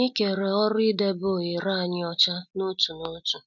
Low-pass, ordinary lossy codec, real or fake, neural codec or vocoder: 7.2 kHz; none; real; none